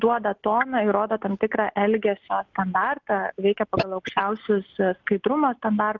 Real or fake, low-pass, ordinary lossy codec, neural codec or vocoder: real; 7.2 kHz; Opus, 24 kbps; none